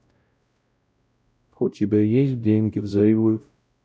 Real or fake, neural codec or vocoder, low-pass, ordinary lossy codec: fake; codec, 16 kHz, 0.5 kbps, X-Codec, WavLM features, trained on Multilingual LibriSpeech; none; none